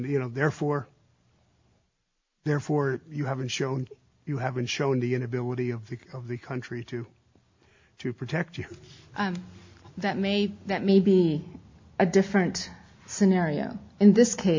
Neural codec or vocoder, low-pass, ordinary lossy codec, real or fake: none; 7.2 kHz; MP3, 48 kbps; real